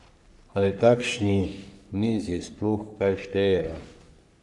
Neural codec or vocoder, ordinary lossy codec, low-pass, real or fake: codec, 44.1 kHz, 3.4 kbps, Pupu-Codec; none; 10.8 kHz; fake